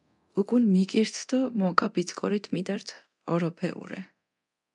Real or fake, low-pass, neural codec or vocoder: fake; 10.8 kHz; codec, 24 kHz, 0.9 kbps, DualCodec